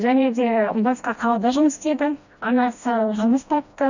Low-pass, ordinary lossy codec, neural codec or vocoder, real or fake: 7.2 kHz; none; codec, 16 kHz, 1 kbps, FreqCodec, smaller model; fake